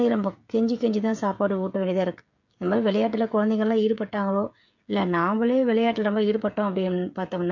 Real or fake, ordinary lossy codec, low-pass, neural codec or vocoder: fake; MP3, 48 kbps; 7.2 kHz; codec, 16 kHz, 16 kbps, FreqCodec, smaller model